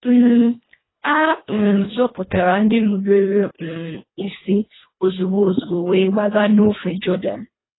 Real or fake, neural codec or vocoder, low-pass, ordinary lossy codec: fake; codec, 24 kHz, 1.5 kbps, HILCodec; 7.2 kHz; AAC, 16 kbps